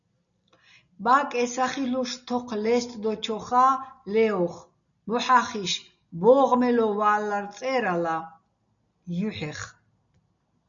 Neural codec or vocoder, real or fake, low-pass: none; real; 7.2 kHz